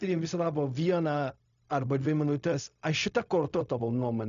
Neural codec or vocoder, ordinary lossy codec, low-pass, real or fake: codec, 16 kHz, 0.4 kbps, LongCat-Audio-Codec; MP3, 96 kbps; 7.2 kHz; fake